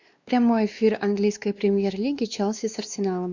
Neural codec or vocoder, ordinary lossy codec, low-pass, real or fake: codec, 16 kHz, 2 kbps, X-Codec, WavLM features, trained on Multilingual LibriSpeech; Opus, 64 kbps; 7.2 kHz; fake